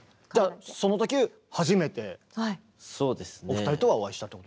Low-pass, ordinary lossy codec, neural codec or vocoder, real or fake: none; none; none; real